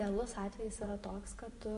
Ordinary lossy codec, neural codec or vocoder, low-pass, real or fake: MP3, 48 kbps; vocoder, 44.1 kHz, 128 mel bands every 512 samples, BigVGAN v2; 14.4 kHz; fake